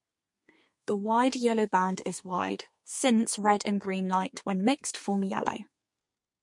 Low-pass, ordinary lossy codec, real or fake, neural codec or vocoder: 10.8 kHz; MP3, 48 kbps; fake; codec, 32 kHz, 1.9 kbps, SNAC